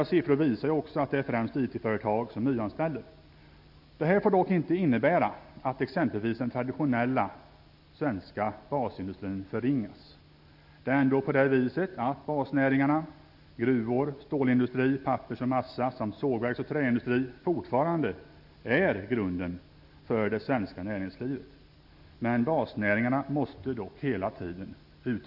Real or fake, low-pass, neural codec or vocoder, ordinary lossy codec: real; 5.4 kHz; none; none